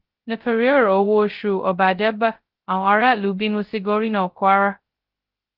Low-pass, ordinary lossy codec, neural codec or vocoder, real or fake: 5.4 kHz; Opus, 16 kbps; codec, 16 kHz, 0.2 kbps, FocalCodec; fake